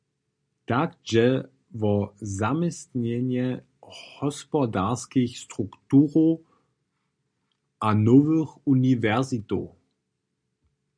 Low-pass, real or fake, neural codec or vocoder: 9.9 kHz; real; none